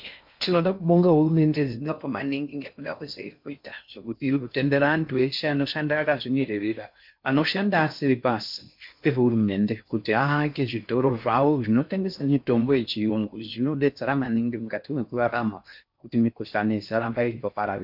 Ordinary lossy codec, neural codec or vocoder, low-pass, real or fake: MP3, 48 kbps; codec, 16 kHz in and 24 kHz out, 0.6 kbps, FocalCodec, streaming, 4096 codes; 5.4 kHz; fake